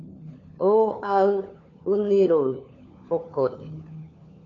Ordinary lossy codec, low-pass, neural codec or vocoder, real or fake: AAC, 48 kbps; 7.2 kHz; codec, 16 kHz, 4 kbps, FunCodec, trained on LibriTTS, 50 frames a second; fake